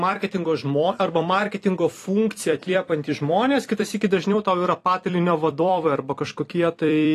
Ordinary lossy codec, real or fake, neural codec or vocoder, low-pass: AAC, 48 kbps; fake; vocoder, 44.1 kHz, 128 mel bands every 256 samples, BigVGAN v2; 14.4 kHz